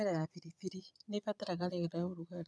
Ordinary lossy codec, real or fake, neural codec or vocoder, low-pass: none; fake; vocoder, 22.05 kHz, 80 mel bands, Vocos; none